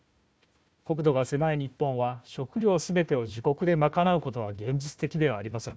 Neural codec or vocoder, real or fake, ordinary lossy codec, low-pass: codec, 16 kHz, 1 kbps, FunCodec, trained on Chinese and English, 50 frames a second; fake; none; none